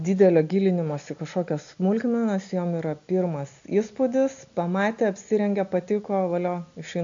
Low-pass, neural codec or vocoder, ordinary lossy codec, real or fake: 7.2 kHz; none; AAC, 64 kbps; real